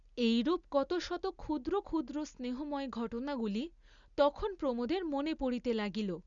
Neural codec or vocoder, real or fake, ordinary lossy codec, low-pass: none; real; Opus, 64 kbps; 7.2 kHz